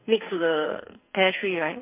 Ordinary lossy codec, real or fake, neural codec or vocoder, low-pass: MP3, 32 kbps; fake; codec, 44.1 kHz, 2.6 kbps, SNAC; 3.6 kHz